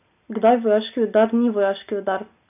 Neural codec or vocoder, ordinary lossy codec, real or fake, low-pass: none; none; real; 3.6 kHz